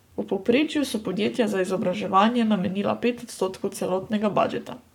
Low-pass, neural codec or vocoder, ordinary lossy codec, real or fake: 19.8 kHz; codec, 44.1 kHz, 7.8 kbps, Pupu-Codec; none; fake